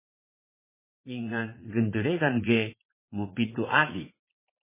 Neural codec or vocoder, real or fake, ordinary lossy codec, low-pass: vocoder, 44.1 kHz, 80 mel bands, Vocos; fake; MP3, 16 kbps; 3.6 kHz